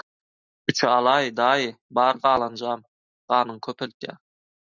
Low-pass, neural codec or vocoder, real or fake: 7.2 kHz; none; real